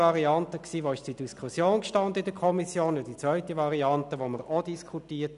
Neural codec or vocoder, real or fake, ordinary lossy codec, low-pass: none; real; none; 10.8 kHz